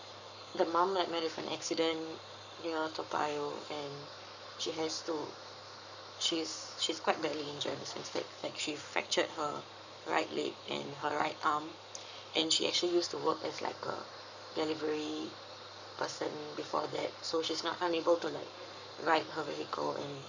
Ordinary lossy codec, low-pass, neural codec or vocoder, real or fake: none; 7.2 kHz; codec, 44.1 kHz, 7.8 kbps, Pupu-Codec; fake